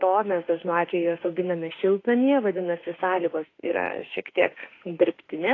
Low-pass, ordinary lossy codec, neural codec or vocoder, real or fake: 7.2 kHz; AAC, 32 kbps; autoencoder, 48 kHz, 32 numbers a frame, DAC-VAE, trained on Japanese speech; fake